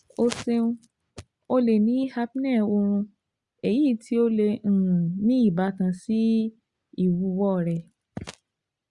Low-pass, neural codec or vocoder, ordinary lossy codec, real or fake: 10.8 kHz; none; Opus, 64 kbps; real